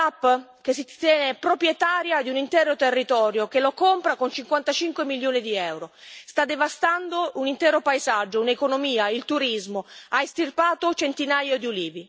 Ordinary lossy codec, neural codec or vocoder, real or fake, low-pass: none; none; real; none